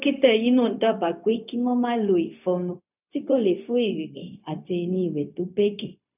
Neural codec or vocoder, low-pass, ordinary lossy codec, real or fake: codec, 16 kHz, 0.4 kbps, LongCat-Audio-Codec; 3.6 kHz; none; fake